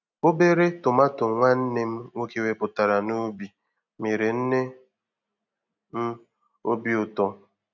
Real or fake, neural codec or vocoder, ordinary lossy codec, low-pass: real; none; none; 7.2 kHz